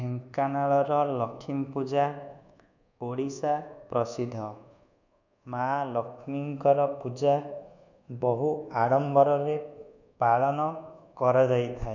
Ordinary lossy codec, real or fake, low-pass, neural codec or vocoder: none; fake; 7.2 kHz; codec, 24 kHz, 1.2 kbps, DualCodec